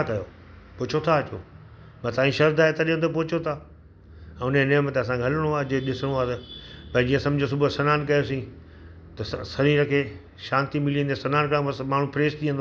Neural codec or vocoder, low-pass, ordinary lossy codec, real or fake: none; none; none; real